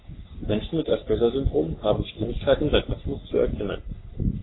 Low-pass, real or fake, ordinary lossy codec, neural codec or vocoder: 7.2 kHz; fake; AAC, 16 kbps; codec, 44.1 kHz, 3.4 kbps, Pupu-Codec